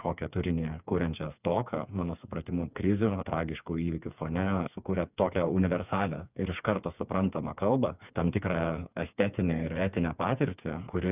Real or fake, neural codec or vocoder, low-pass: fake; codec, 16 kHz, 4 kbps, FreqCodec, smaller model; 3.6 kHz